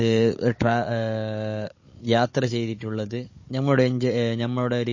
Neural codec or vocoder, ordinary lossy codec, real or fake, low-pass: codec, 16 kHz, 16 kbps, FunCodec, trained on Chinese and English, 50 frames a second; MP3, 32 kbps; fake; 7.2 kHz